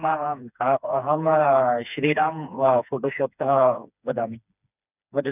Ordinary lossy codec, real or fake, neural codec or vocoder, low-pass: none; fake; codec, 16 kHz, 2 kbps, FreqCodec, smaller model; 3.6 kHz